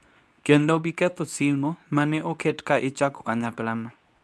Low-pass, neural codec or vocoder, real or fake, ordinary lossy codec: none; codec, 24 kHz, 0.9 kbps, WavTokenizer, medium speech release version 2; fake; none